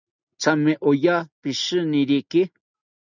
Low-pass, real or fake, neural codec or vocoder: 7.2 kHz; real; none